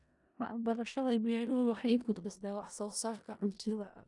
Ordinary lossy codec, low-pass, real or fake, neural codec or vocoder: none; 10.8 kHz; fake; codec, 16 kHz in and 24 kHz out, 0.4 kbps, LongCat-Audio-Codec, four codebook decoder